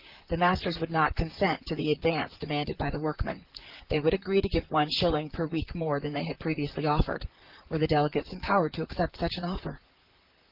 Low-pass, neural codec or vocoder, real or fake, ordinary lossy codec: 5.4 kHz; vocoder, 44.1 kHz, 128 mel bands, Pupu-Vocoder; fake; Opus, 16 kbps